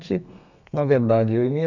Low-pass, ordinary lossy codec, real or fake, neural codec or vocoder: 7.2 kHz; none; fake; codec, 32 kHz, 1.9 kbps, SNAC